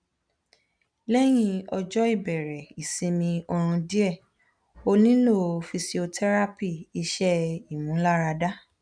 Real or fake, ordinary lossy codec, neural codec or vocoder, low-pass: real; none; none; 9.9 kHz